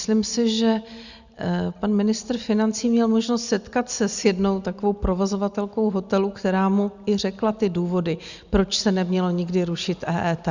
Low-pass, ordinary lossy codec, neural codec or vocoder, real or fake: 7.2 kHz; Opus, 64 kbps; none; real